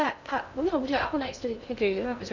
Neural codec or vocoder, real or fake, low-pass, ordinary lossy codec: codec, 16 kHz in and 24 kHz out, 0.6 kbps, FocalCodec, streaming, 2048 codes; fake; 7.2 kHz; none